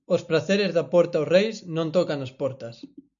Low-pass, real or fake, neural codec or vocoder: 7.2 kHz; real; none